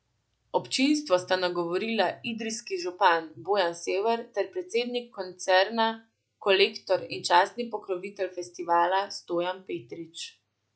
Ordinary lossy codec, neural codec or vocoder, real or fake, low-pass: none; none; real; none